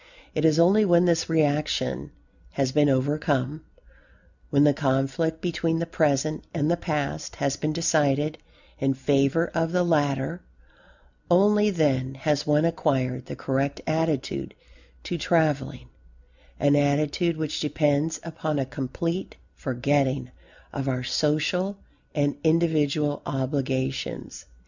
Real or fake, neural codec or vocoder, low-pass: real; none; 7.2 kHz